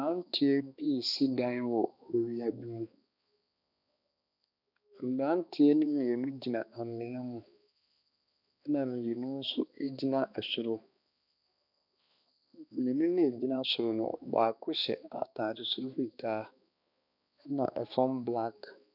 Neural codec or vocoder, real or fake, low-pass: codec, 16 kHz, 2 kbps, X-Codec, HuBERT features, trained on balanced general audio; fake; 5.4 kHz